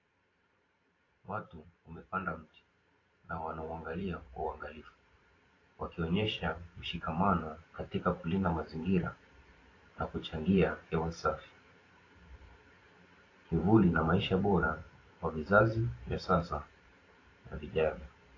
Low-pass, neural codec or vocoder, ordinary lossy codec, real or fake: 7.2 kHz; vocoder, 44.1 kHz, 128 mel bands every 512 samples, BigVGAN v2; AAC, 32 kbps; fake